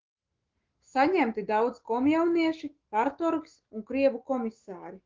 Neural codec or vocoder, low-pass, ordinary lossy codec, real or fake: autoencoder, 48 kHz, 128 numbers a frame, DAC-VAE, trained on Japanese speech; 7.2 kHz; Opus, 16 kbps; fake